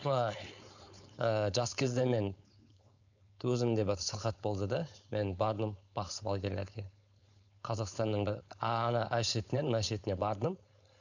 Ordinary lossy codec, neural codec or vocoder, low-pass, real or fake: none; codec, 16 kHz, 4.8 kbps, FACodec; 7.2 kHz; fake